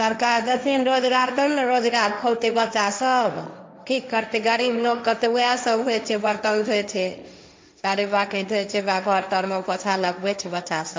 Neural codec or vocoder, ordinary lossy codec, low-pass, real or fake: codec, 16 kHz, 1.1 kbps, Voila-Tokenizer; none; none; fake